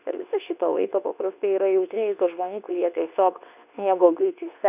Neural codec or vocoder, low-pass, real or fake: codec, 24 kHz, 0.9 kbps, WavTokenizer, medium speech release version 2; 3.6 kHz; fake